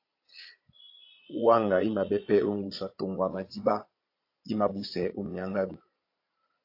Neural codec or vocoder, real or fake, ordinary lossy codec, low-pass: vocoder, 22.05 kHz, 80 mel bands, Vocos; fake; AAC, 32 kbps; 5.4 kHz